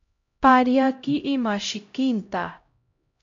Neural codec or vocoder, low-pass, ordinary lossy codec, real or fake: codec, 16 kHz, 0.5 kbps, X-Codec, HuBERT features, trained on LibriSpeech; 7.2 kHz; AAC, 64 kbps; fake